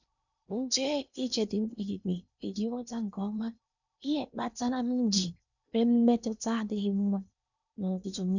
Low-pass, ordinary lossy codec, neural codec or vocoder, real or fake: 7.2 kHz; none; codec, 16 kHz in and 24 kHz out, 0.8 kbps, FocalCodec, streaming, 65536 codes; fake